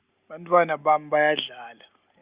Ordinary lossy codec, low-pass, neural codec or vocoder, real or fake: Opus, 64 kbps; 3.6 kHz; none; real